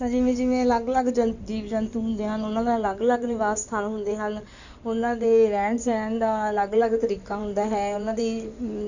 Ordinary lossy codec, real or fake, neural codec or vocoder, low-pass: none; fake; codec, 16 kHz in and 24 kHz out, 2.2 kbps, FireRedTTS-2 codec; 7.2 kHz